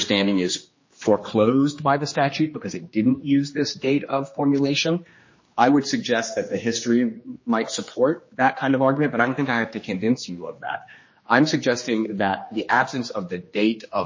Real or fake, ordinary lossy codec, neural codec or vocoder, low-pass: fake; MP3, 32 kbps; codec, 16 kHz, 2 kbps, X-Codec, HuBERT features, trained on general audio; 7.2 kHz